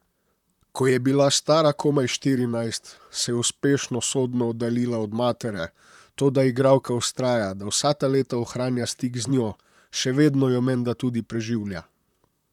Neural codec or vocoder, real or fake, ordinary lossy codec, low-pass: vocoder, 44.1 kHz, 128 mel bands, Pupu-Vocoder; fake; none; 19.8 kHz